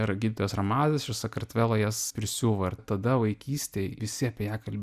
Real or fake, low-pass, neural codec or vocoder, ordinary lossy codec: real; 14.4 kHz; none; Opus, 64 kbps